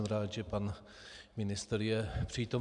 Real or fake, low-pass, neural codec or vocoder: real; 10.8 kHz; none